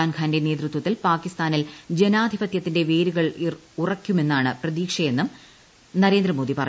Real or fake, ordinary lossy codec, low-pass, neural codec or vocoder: real; none; none; none